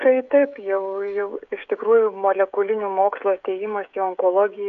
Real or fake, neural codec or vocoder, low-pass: fake; codec, 16 kHz, 16 kbps, FreqCodec, smaller model; 7.2 kHz